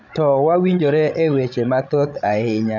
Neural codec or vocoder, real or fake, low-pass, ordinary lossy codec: codec, 16 kHz, 16 kbps, FreqCodec, larger model; fake; 7.2 kHz; none